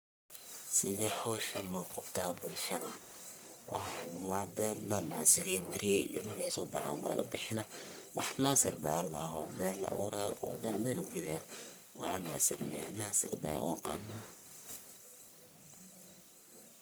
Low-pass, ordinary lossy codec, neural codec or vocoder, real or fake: none; none; codec, 44.1 kHz, 1.7 kbps, Pupu-Codec; fake